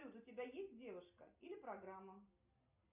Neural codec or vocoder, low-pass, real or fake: none; 3.6 kHz; real